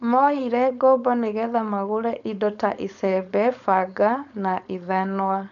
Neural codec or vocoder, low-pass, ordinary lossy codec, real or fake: codec, 16 kHz, 4.8 kbps, FACodec; 7.2 kHz; none; fake